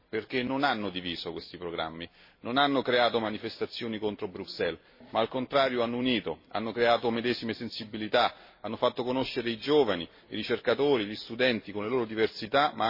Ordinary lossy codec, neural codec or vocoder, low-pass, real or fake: MP3, 24 kbps; none; 5.4 kHz; real